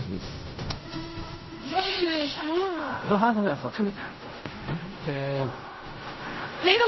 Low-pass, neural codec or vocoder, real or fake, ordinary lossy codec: 7.2 kHz; codec, 16 kHz in and 24 kHz out, 0.4 kbps, LongCat-Audio-Codec, fine tuned four codebook decoder; fake; MP3, 24 kbps